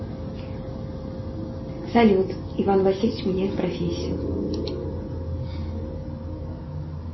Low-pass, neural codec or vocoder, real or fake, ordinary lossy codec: 7.2 kHz; none; real; MP3, 24 kbps